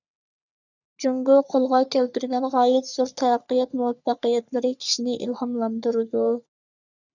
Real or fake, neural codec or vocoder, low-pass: fake; codec, 44.1 kHz, 3.4 kbps, Pupu-Codec; 7.2 kHz